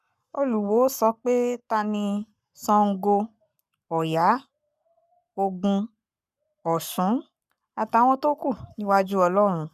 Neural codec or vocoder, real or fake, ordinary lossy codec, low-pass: codec, 44.1 kHz, 7.8 kbps, Pupu-Codec; fake; none; 14.4 kHz